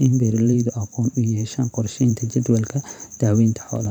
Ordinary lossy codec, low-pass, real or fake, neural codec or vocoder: none; 19.8 kHz; fake; vocoder, 44.1 kHz, 128 mel bands every 512 samples, BigVGAN v2